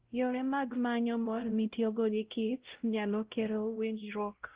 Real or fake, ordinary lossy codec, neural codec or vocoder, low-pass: fake; Opus, 16 kbps; codec, 16 kHz, 0.5 kbps, X-Codec, WavLM features, trained on Multilingual LibriSpeech; 3.6 kHz